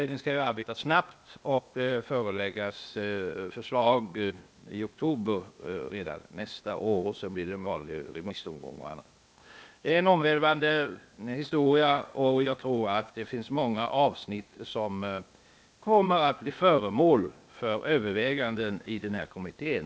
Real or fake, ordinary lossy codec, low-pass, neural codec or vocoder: fake; none; none; codec, 16 kHz, 0.8 kbps, ZipCodec